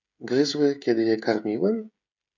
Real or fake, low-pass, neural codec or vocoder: fake; 7.2 kHz; codec, 16 kHz, 16 kbps, FreqCodec, smaller model